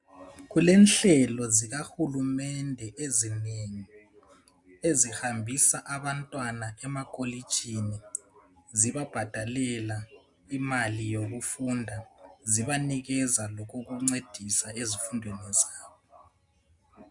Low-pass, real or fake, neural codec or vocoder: 10.8 kHz; real; none